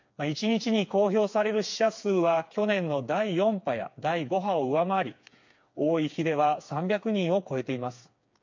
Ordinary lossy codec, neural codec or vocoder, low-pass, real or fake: MP3, 48 kbps; codec, 16 kHz, 4 kbps, FreqCodec, smaller model; 7.2 kHz; fake